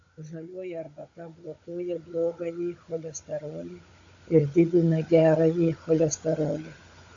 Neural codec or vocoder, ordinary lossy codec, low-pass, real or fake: codec, 16 kHz, 16 kbps, FunCodec, trained on Chinese and English, 50 frames a second; AAC, 48 kbps; 7.2 kHz; fake